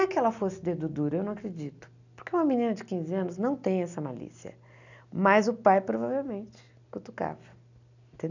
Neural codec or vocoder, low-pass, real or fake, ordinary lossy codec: none; 7.2 kHz; real; none